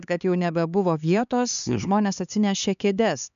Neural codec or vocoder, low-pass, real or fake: codec, 16 kHz, 4 kbps, X-Codec, HuBERT features, trained on LibriSpeech; 7.2 kHz; fake